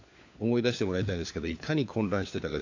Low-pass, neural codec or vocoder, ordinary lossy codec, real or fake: 7.2 kHz; codec, 16 kHz, 4 kbps, FunCodec, trained on LibriTTS, 50 frames a second; AAC, 48 kbps; fake